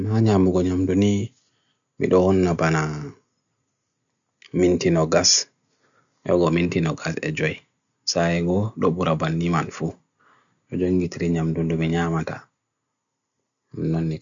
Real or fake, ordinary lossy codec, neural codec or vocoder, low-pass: real; none; none; 7.2 kHz